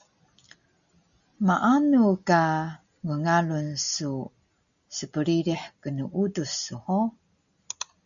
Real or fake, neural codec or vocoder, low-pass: real; none; 7.2 kHz